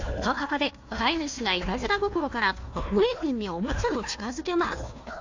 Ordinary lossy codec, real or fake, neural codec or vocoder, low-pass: AAC, 48 kbps; fake; codec, 16 kHz, 1 kbps, FunCodec, trained on Chinese and English, 50 frames a second; 7.2 kHz